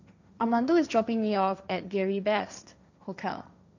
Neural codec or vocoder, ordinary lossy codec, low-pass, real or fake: codec, 16 kHz, 1.1 kbps, Voila-Tokenizer; none; 7.2 kHz; fake